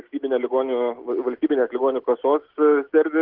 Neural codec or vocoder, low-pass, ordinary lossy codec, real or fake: none; 5.4 kHz; Opus, 16 kbps; real